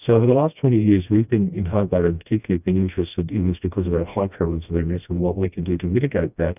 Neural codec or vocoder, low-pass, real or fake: codec, 16 kHz, 1 kbps, FreqCodec, smaller model; 3.6 kHz; fake